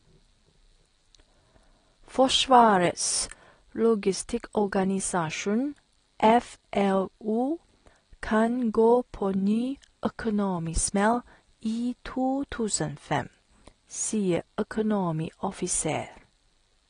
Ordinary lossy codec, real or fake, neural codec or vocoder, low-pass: AAC, 32 kbps; real; none; 9.9 kHz